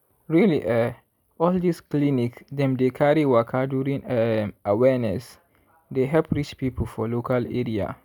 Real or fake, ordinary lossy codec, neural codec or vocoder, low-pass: real; none; none; none